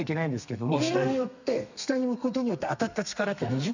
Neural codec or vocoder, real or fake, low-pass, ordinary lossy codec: codec, 32 kHz, 1.9 kbps, SNAC; fake; 7.2 kHz; none